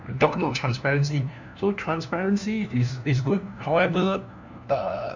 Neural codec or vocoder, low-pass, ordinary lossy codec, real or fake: codec, 16 kHz, 1 kbps, FunCodec, trained on LibriTTS, 50 frames a second; 7.2 kHz; none; fake